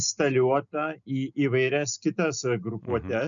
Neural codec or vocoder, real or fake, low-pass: none; real; 7.2 kHz